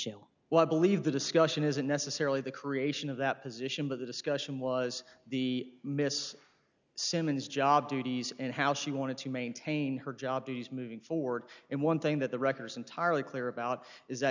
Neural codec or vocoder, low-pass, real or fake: none; 7.2 kHz; real